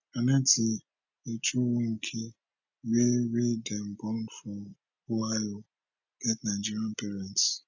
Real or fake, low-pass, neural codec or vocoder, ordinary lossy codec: real; 7.2 kHz; none; none